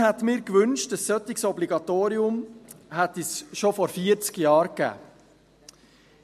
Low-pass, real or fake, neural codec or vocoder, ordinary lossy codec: 14.4 kHz; real; none; MP3, 64 kbps